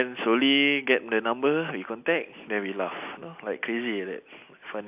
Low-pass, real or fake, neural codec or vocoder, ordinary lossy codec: 3.6 kHz; real; none; AAC, 32 kbps